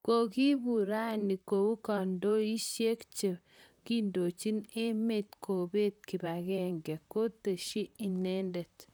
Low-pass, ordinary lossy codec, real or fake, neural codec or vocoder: none; none; fake; vocoder, 44.1 kHz, 128 mel bands, Pupu-Vocoder